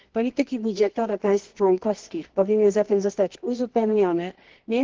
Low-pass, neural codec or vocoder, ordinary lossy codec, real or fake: 7.2 kHz; codec, 24 kHz, 0.9 kbps, WavTokenizer, medium music audio release; Opus, 16 kbps; fake